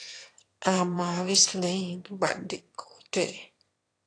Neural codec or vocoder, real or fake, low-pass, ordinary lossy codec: autoencoder, 22.05 kHz, a latent of 192 numbers a frame, VITS, trained on one speaker; fake; 9.9 kHz; AAC, 48 kbps